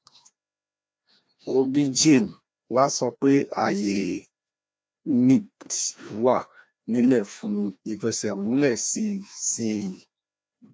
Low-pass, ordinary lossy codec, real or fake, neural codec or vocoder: none; none; fake; codec, 16 kHz, 1 kbps, FreqCodec, larger model